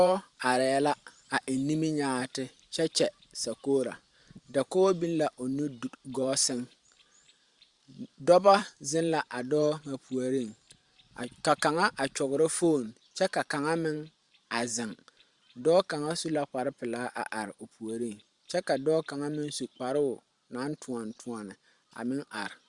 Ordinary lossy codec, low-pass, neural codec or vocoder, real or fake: Opus, 64 kbps; 10.8 kHz; vocoder, 44.1 kHz, 128 mel bands every 512 samples, BigVGAN v2; fake